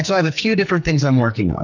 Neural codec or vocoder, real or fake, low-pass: codec, 44.1 kHz, 2.6 kbps, SNAC; fake; 7.2 kHz